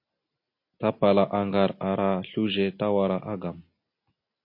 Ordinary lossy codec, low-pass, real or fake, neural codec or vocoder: MP3, 32 kbps; 5.4 kHz; real; none